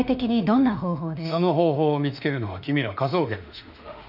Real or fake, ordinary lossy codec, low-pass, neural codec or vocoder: fake; none; 5.4 kHz; autoencoder, 48 kHz, 32 numbers a frame, DAC-VAE, trained on Japanese speech